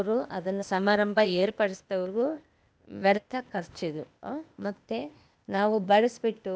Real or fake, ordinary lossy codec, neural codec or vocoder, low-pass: fake; none; codec, 16 kHz, 0.8 kbps, ZipCodec; none